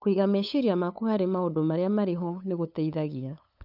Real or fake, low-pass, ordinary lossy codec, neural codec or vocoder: fake; 5.4 kHz; none; codec, 16 kHz, 8 kbps, FunCodec, trained on LibriTTS, 25 frames a second